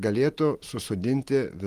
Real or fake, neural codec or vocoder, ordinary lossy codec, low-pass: real; none; Opus, 24 kbps; 14.4 kHz